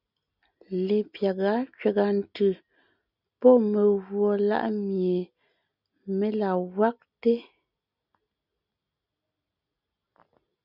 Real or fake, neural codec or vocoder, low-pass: real; none; 5.4 kHz